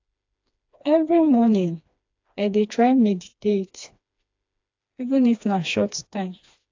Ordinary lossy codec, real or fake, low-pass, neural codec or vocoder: AAC, 48 kbps; fake; 7.2 kHz; codec, 16 kHz, 2 kbps, FreqCodec, smaller model